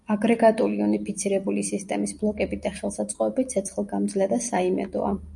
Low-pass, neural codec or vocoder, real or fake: 10.8 kHz; none; real